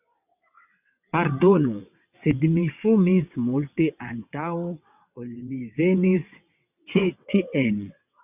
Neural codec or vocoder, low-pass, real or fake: vocoder, 22.05 kHz, 80 mel bands, WaveNeXt; 3.6 kHz; fake